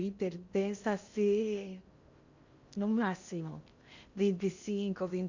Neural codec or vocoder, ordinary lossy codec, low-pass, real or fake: codec, 16 kHz in and 24 kHz out, 0.8 kbps, FocalCodec, streaming, 65536 codes; Opus, 64 kbps; 7.2 kHz; fake